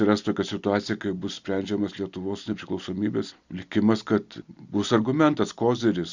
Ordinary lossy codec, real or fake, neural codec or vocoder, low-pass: Opus, 64 kbps; real; none; 7.2 kHz